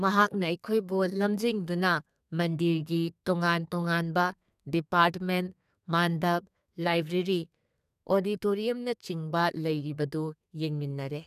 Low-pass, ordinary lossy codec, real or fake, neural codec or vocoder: 14.4 kHz; none; fake; codec, 32 kHz, 1.9 kbps, SNAC